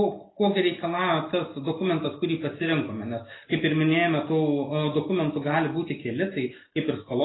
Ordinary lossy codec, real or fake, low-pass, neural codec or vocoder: AAC, 16 kbps; real; 7.2 kHz; none